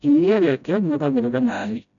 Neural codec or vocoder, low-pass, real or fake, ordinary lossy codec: codec, 16 kHz, 0.5 kbps, FreqCodec, smaller model; 7.2 kHz; fake; none